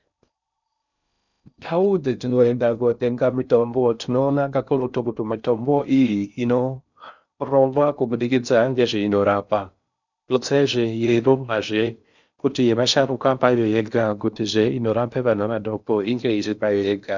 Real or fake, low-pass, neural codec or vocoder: fake; 7.2 kHz; codec, 16 kHz in and 24 kHz out, 0.6 kbps, FocalCodec, streaming, 4096 codes